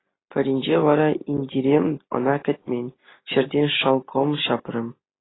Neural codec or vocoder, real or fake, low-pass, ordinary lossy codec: none; real; 7.2 kHz; AAC, 16 kbps